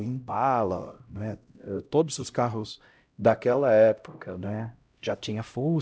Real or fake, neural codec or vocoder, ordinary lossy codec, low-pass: fake; codec, 16 kHz, 0.5 kbps, X-Codec, HuBERT features, trained on LibriSpeech; none; none